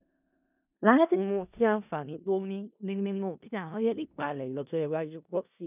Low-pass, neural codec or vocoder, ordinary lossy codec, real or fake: 3.6 kHz; codec, 16 kHz in and 24 kHz out, 0.4 kbps, LongCat-Audio-Codec, four codebook decoder; none; fake